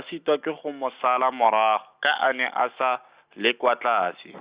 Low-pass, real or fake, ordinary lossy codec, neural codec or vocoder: 3.6 kHz; real; Opus, 64 kbps; none